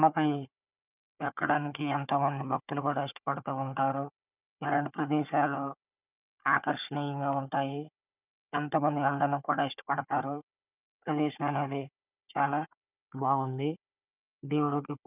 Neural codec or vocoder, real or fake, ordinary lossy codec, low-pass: codec, 16 kHz, 4 kbps, FunCodec, trained on Chinese and English, 50 frames a second; fake; none; 3.6 kHz